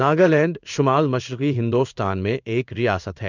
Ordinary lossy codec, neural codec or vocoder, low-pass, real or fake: none; codec, 16 kHz in and 24 kHz out, 1 kbps, XY-Tokenizer; 7.2 kHz; fake